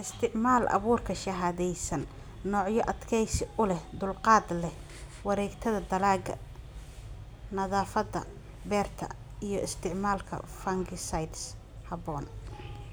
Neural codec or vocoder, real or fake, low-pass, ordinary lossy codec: none; real; none; none